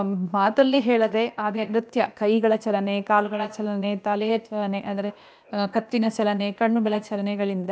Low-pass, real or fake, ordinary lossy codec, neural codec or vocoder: none; fake; none; codec, 16 kHz, 0.8 kbps, ZipCodec